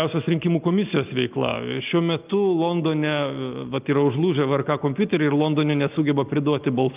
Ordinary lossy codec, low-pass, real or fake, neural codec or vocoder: Opus, 24 kbps; 3.6 kHz; real; none